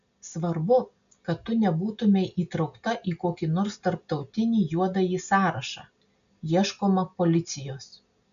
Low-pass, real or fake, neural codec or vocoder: 7.2 kHz; real; none